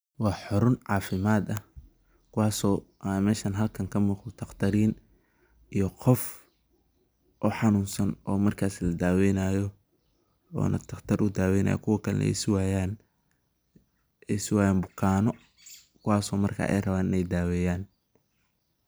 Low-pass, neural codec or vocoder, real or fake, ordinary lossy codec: none; none; real; none